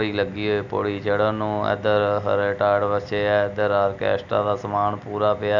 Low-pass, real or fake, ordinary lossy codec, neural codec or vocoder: 7.2 kHz; real; none; none